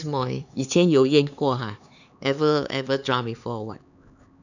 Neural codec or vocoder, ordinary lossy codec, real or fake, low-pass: codec, 16 kHz, 4 kbps, X-Codec, HuBERT features, trained on LibriSpeech; none; fake; 7.2 kHz